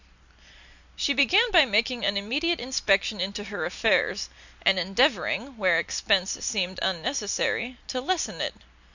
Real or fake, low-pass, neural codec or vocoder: real; 7.2 kHz; none